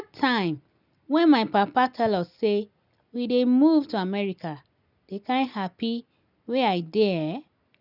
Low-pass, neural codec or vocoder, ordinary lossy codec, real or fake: 5.4 kHz; none; none; real